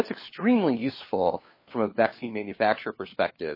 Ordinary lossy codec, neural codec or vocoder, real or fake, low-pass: MP3, 24 kbps; codec, 16 kHz, 4 kbps, FunCodec, trained on LibriTTS, 50 frames a second; fake; 5.4 kHz